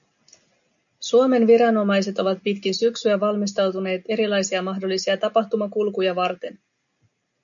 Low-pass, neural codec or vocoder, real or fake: 7.2 kHz; none; real